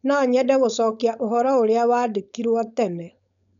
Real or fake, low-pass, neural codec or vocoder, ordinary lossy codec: fake; 7.2 kHz; codec, 16 kHz, 4.8 kbps, FACodec; none